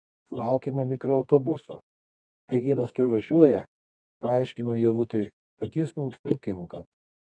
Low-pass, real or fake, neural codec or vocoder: 9.9 kHz; fake; codec, 24 kHz, 0.9 kbps, WavTokenizer, medium music audio release